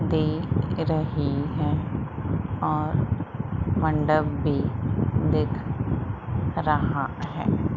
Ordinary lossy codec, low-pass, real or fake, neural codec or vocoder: none; 7.2 kHz; real; none